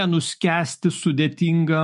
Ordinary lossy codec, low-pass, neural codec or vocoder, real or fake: MP3, 48 kbps; 14.4 kHz; autoencoder, 48 kHz, 128 numbers a frame, DAC-VAE, trained on Japanese speech; fake